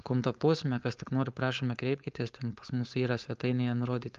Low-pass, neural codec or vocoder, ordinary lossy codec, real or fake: 7.2 kHz; codec, 16 kHz, 4.8 kbps, FACodec; Opus, 32 kbps; fake